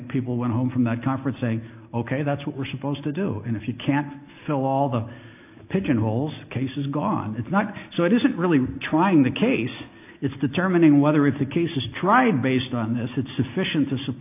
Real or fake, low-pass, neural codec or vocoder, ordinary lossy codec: real; 3.6 kHz; none; AAC, 32 kbps